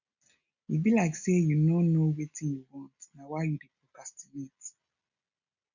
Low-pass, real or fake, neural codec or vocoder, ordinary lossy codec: 7.2 kHz; real; none; none